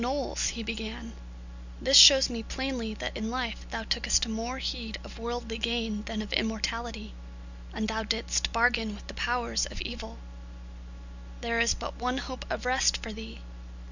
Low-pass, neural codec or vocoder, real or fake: 7.2 kHz; none; real